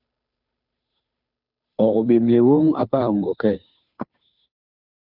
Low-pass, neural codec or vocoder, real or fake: 5.4 kHz; codec, 16 kHz, 2 kbps, FunCodec, trained on Chinese and English, 25 frames a second; fake